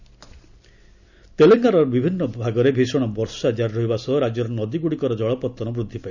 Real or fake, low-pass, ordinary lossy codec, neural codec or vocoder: real; 7.2 kHz; none; none